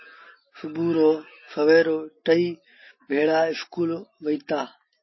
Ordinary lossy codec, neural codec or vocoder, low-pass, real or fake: MP3, 24 kbps; none; 7.2 kHz; real